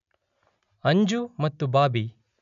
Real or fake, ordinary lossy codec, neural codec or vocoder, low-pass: real; none; none; 7.2 kHz